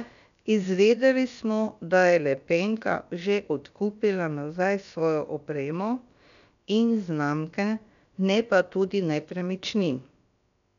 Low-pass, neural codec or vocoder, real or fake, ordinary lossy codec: 7.2 kHz; codec, 16 kHz, about 1 kbps, DyCAST, with the encoder's durations; fake; none